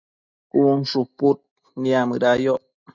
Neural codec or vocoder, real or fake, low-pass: none; real; 7.2 kHz